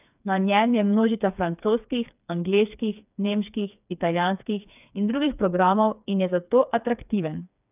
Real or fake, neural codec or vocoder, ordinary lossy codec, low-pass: fake; codec, 16 kHz, 4 kbps, FreqCodec, smaller model; none; 3.6 kHz